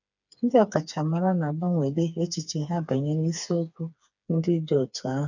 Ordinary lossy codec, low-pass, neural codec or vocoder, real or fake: none; 7.2 kHz; codec, 16 kHz, 4 kbps, FreqCodec, smaller model; fake